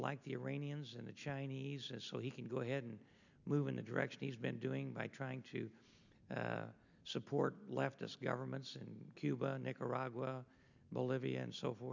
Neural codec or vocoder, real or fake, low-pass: none; real; 7.2 kHz